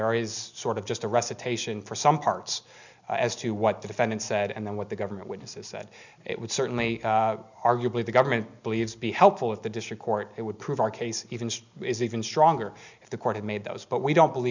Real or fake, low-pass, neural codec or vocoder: real; 7.2 kHz; none